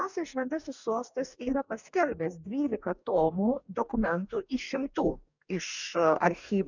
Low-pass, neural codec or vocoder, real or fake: 7.2 kHz; codec, 44.1 kHz, 2.6 kbps, DAC; fake